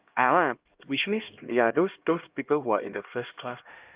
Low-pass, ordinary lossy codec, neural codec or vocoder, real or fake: 3.6 kHz; Opus, 32 kbps; codec, 16 kHz, 1 kbps, X-Codec, HuBERT features, trained on LibriSpeech; fake